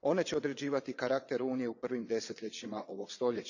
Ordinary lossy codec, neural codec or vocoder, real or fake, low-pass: none; vocoder, 22.05 kHz, 80 mel bands, WaveNeXt; fake; 7.2 kHz